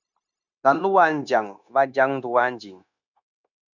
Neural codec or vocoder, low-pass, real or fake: codec, 16 kHz, 0.9 kbps, LongCat-Audio-Codec; 7.2 kHz; fake